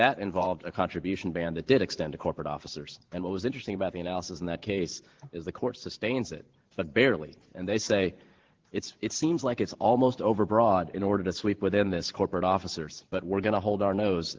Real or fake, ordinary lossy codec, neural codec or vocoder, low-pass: fake; Opus, 16 kbps; vocoder, 44.1 kHz, 128 mel bands every 512 samples, BigVGAN v2; 7.2 kHz